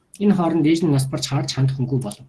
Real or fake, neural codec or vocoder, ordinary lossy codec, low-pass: real; none; Opus, 16 kbps; 10.8 kHz